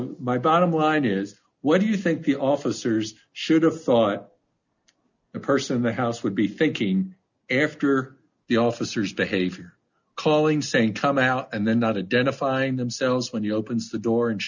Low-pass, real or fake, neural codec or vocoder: 7.2 kHz; real; none